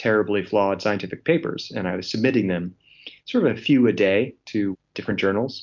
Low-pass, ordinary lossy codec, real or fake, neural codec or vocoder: 7.2 kHz; MP3, 64 kbps; real; none